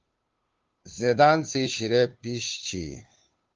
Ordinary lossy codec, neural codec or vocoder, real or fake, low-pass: Opus, 32 kbps; codec, 16 kHz, 2 kbps, FunCodec, trained on Chinese and English, 25 frames a second; fake; 7.2 kHz